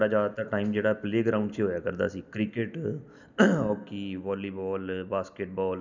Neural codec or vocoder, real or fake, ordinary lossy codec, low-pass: none; real; none; 7.2 kHz